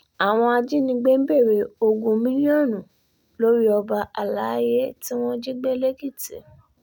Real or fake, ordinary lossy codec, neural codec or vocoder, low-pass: real; none; none; 19.8 kHz